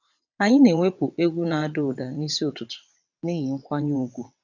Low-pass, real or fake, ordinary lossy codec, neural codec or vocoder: 7.2 kHz; fake; none; vocoder, 22.05 kHz, 80 mel bands, WaveNeXt